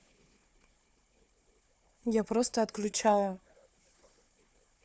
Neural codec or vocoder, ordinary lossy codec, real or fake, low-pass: codec, 16 kHz, 4 kbps, FunCodec, trained on Chinese and English, 50 frames a second; none; fake; none